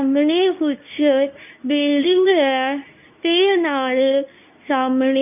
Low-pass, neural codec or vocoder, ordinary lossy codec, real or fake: 3.6 kHz; codec, 24 kHz, 0.9 kbps, WavTokenizer, medium speech release version 2; none; fake